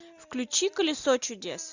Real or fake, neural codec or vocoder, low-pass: real; none; 7.2 kHz